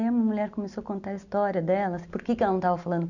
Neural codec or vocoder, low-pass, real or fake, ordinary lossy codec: none; 7.2 kHz; real; none